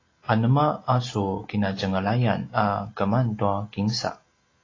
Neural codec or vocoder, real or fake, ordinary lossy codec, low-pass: none; real; AAC, 32 kbps; 7.2 kHz